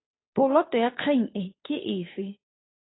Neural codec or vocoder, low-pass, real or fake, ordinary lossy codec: codec, 16 kHz, 2 kbps, FunCodec, trained on Chinese and English, 25 frames a second; 7.2 kHz; fake; AAC, 16 kbps